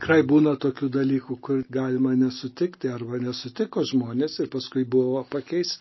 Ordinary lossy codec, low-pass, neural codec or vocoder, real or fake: MP3, 24 kbps; 7.2 kHz; none; real